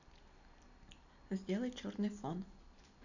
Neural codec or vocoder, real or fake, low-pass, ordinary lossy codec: none; real; 7.2 kHz; none